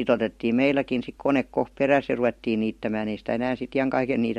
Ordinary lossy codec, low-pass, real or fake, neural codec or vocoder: MP3, 64 kbps; 19.8 kHz; real; none